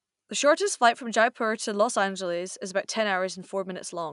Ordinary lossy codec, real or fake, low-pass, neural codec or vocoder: none; real; 10.8 kHz; none